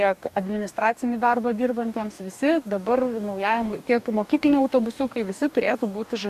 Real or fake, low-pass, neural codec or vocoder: fake; 14.4 kHz; codec, 44.1 kHz, 2.6 kbps, DAC